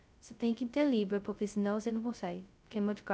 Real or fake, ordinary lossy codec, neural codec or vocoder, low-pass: fake; none; codec, 16 kHz, 0.2 kbps, FocalCodec; none